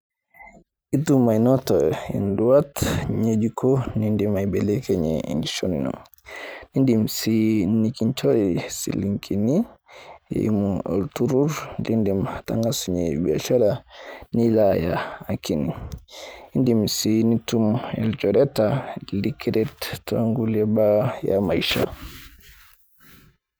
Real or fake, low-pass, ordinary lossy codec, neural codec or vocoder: fake; none; none; vocoder, 44.1 kHz, 128 mel bands every 512 samples, BigVGAN v2